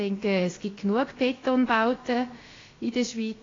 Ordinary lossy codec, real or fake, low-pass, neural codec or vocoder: AAC, 32 kbps; fake; 7.2 kHz; codec, 16 kHz, about 1 kbps, DyCAST, with the encoder's durations